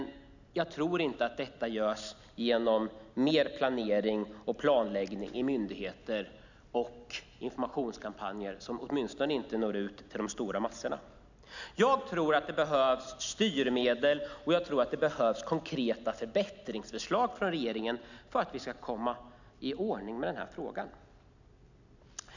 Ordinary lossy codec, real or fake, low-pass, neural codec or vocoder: none; real; 7.2 kHz; none